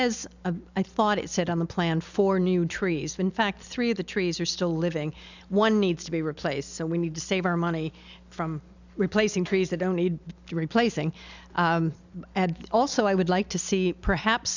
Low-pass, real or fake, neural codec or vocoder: 7.2 kHz; real; none